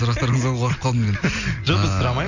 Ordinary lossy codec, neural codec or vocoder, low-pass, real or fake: none; none; 7.2 kHz; real